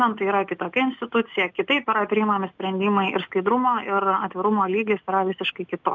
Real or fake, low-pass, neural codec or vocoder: real; 7.2 kHz; none